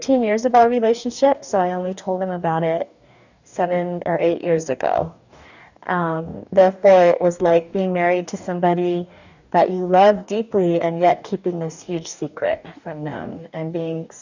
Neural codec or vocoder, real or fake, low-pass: codec, 44.1 kHz, 2.6 kbps, DAC; fake; 7.2 kHz